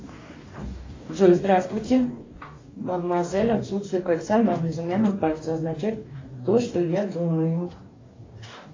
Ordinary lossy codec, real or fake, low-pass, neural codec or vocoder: AAC, 32 kbps; fake; 7.2 kHz; codec, 44.1 kHz, 2.6 kbps, DAC